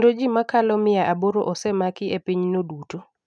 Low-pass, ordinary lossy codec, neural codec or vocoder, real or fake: 9.9 kHz; none; none; real